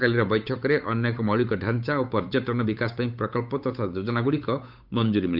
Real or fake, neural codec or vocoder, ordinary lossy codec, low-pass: fake; codec, 16 kHz, 8 kbps, FunCodec, trained on Chinese and English, 25 frames a second; none; 5.4 kHz